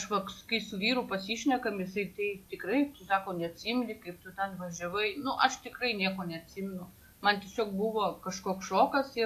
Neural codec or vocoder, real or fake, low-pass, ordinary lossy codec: none; real; 14.4 kHz; AAC, 64 kbps